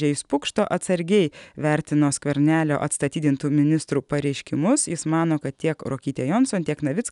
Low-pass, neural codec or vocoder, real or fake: 10.8 kHz; none; real